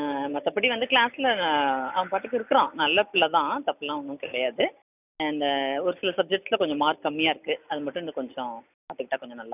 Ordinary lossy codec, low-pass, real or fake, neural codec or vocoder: none; 3.6 kHz; real; none